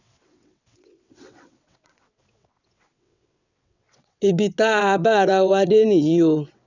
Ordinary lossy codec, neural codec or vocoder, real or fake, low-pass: none; vocoder, 22.05 kHz, 80 mel bands, WaveNeXt; fake; 7.2 kHz